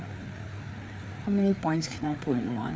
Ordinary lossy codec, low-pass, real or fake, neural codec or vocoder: none; none; fake; codec, 16 kHz, 4 kbps, FreqCodec, larger model